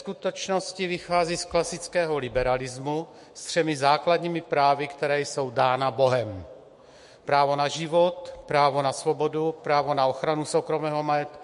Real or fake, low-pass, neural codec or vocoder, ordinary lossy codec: fake; 14.4 kHz; autoencoder, 48 kHz, 128 numbers a frame, DAC-VAE, trained on Japanese speech; MP3, 48 kbps